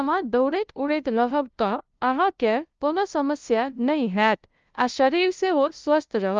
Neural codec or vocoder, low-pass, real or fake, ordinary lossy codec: codec, 16 kHz, 0.5 kbps, FunCodec, trained on LibriTTS, 25 frames a second; 7.2 kHz; fake; Opus, 24 kbps